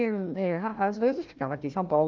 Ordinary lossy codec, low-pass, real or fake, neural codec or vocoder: Opus, 24 kbps; 7.2 kHz; fake; codec, 16 kHz, 1 kbps, FunCodec, trained on Chinese and English, 50 frames a second